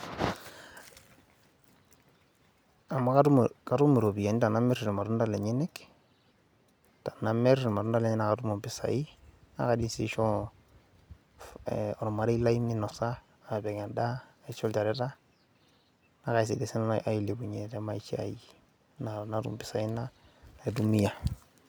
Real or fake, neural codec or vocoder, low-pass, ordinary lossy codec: real; none; none; none